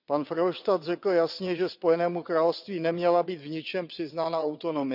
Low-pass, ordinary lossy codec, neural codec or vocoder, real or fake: 5.4 kHz; none; vocoder, 22.05 kHz, 80 mel bands, WaveNeXt; fake